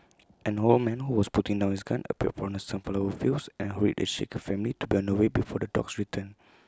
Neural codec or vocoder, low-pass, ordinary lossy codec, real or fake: none; none; none; real